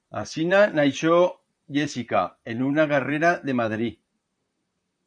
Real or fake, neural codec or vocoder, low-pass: fake; vocoder, 44.1 kHz, 128 mel bands, Pupu-Vocoder; 9.9 kHz